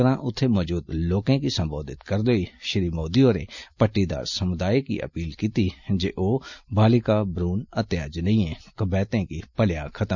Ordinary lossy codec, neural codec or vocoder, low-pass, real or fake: none; none; 7.2 kHz; real